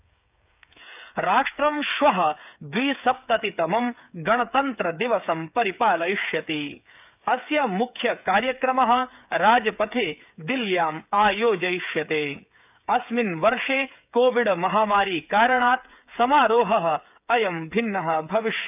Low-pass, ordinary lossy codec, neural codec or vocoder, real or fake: 3.6 kHz; none; codec, 16 kHz, 8 kbps, FreqCodec, smaller model; fake